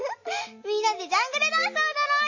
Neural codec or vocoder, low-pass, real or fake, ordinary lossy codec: none; 7.2 kHz; real; MP3, 32 kbps